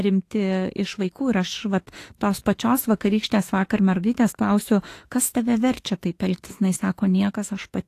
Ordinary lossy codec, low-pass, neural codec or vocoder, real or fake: AAC, 48 kbps; 14.4 kHz; autoencoder, 48 kHz, 32 numbers a frame, DAC-VAE, trained on Japanese speech; fake